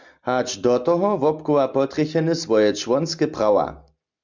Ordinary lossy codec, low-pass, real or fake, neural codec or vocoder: MP3, 64 kbps; 7.2 kHz; real; none